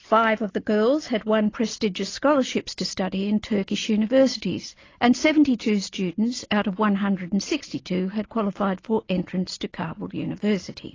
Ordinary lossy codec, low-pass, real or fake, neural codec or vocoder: AAC, 32 kbps; 7.2 kHz; real; none